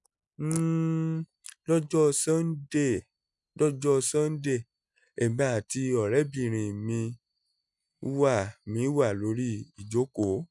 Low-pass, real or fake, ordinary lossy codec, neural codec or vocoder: 10.8 kHz; real; none; none